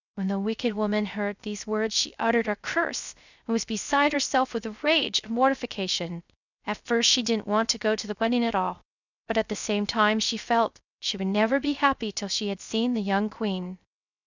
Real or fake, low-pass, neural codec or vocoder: fake; 7.2 kHz; codec, 16 kHz, 0.3 kbps, FocalCodec